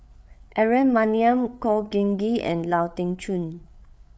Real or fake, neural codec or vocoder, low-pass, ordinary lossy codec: fake; codec, 16 kHz, 8 kbps, FreqCodec, larger model; none; none